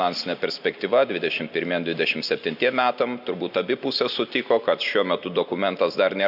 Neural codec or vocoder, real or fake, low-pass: none; real; 5.4 kHz